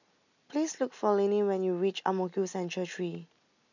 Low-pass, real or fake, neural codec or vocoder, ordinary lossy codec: 7.2 kHz; real; none; none